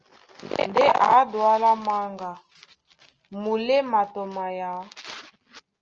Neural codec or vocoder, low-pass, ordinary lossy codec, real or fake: none; 7.2 kHz; Opus, 24 kbps; real